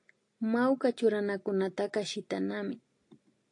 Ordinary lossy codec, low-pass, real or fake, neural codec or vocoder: AAC, 48 kbps; 10.8 kHz; real; none